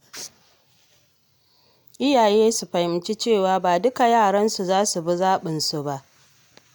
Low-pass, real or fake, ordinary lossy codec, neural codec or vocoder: none; real; none; none